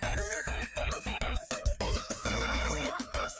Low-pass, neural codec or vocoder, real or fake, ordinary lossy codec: none; codec, 16 kHz, 2 kbps, FreqCodec, larger model; fake; none